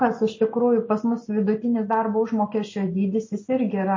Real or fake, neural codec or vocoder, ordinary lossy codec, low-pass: real; none; MP3, 32 kbps; 7.2 kHz